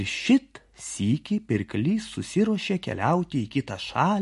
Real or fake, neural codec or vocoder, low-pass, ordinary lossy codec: real; none; 14.4 kHz; MP3, 48 kbps